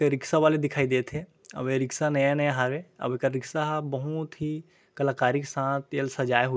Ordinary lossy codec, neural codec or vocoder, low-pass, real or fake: none; none; none; real